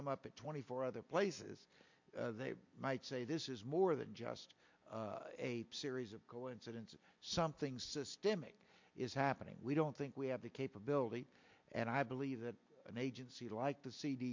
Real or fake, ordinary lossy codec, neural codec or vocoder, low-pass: real; MP3, 48 kbps; none; 7.2 kHz